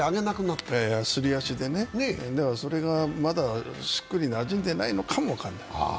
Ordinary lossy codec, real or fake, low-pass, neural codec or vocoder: none; real; none; none